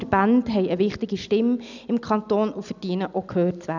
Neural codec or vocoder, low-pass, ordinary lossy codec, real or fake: none; 7.2 kHz; none; real